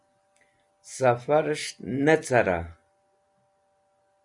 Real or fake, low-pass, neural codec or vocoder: real; 10.8 kHz; none